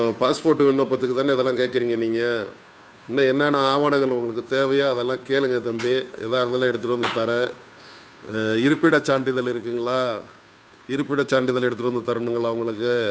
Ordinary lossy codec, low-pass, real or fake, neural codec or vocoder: none; none; fake; codec, 16 kHz, 2 kbps, FunCodec, trained on Chinese and English, 25 frames a second